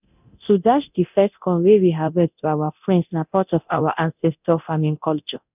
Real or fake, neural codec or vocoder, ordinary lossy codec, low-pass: fake; codec, 24 kHz, 0.9 kbps, DualCodec; none; 3.6 kHz